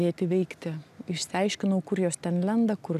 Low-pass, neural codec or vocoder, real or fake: 14.4 kHz; none; real